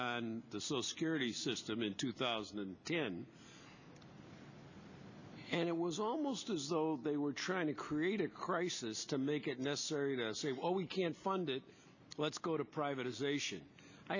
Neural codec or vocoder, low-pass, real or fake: none; 7.2 kHz; real